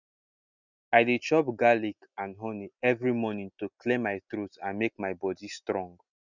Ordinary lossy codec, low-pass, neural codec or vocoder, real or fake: none; 7.2 kHz; none; real